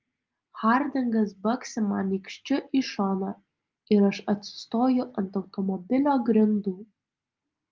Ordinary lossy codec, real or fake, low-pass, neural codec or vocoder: Opus, 32 kbps; real; 7.2 kHz; none